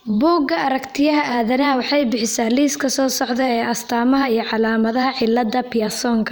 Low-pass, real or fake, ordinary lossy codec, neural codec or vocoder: none; fake; none; vocoder, 44.1 kHz, 128 mel bands every 512 samples, BigVGAN v2